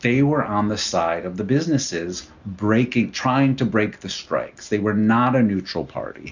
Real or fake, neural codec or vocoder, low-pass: real; none; 7.2 kHz